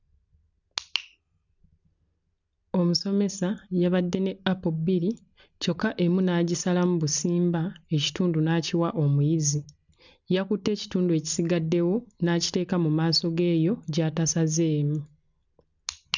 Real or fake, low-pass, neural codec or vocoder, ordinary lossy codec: real; 7.2 kHz; none; none